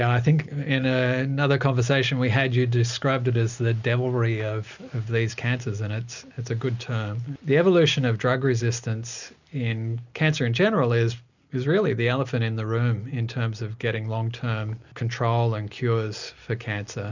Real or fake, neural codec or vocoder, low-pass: real; none; 7.2 kHz